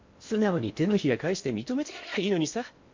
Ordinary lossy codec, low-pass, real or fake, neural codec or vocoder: MP3, 48 kbps; 7.2 kHz; fake; codec, 16 kHz in and 24 kHz out, 0.8 kbps, FocalCodec, streaming, 65536 codes